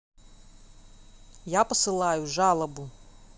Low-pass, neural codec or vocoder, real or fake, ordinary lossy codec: none; none; real; none